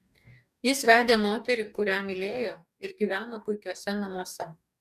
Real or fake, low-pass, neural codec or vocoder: fake; 14.4 kHz; codec, 44.1 kHz, 2.6 kbps, DAC